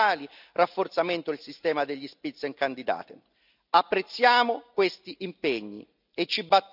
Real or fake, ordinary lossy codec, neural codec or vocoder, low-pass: real; none; none; 5.4 kHz